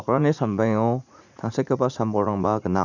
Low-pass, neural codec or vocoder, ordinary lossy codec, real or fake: 7.2 kHz; vocoder, 44.1 kHz, 80 mel bands, Vocos; none; fake